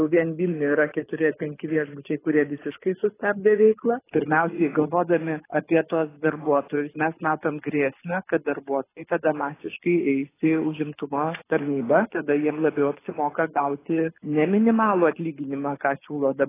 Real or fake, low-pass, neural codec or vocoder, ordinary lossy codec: fake; 3.6 kHz; codec, 24 kHz, 6 kbps, HILCodec; AAC, 16 kbps